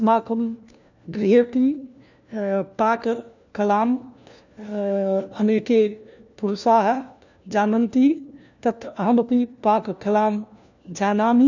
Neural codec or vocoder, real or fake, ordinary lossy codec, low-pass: codec, 16 kHz, 1 kbps, FunCodec, trained on LibriTTS, 50 frames a second; fake; none; 7.2 kHz